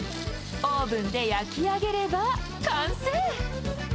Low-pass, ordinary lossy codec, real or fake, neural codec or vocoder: none; none; real; none